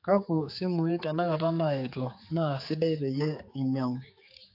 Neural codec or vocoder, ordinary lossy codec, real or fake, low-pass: codec, 16 kHz, 4 kbps, X-Codec, HuBERT features, trained on general audio; AAC, 32 kbps; fake; 5.4 kHz